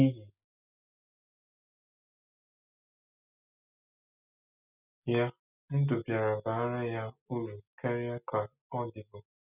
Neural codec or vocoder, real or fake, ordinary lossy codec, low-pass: none; real; none; 3.6 kHz